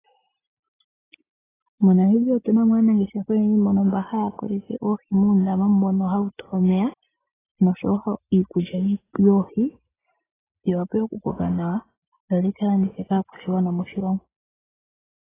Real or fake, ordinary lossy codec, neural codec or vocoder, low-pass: real; AAC, 16 kbps; none; 3.6 kHz